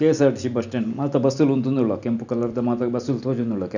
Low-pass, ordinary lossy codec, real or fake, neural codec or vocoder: 7.2 kHz; none; real; none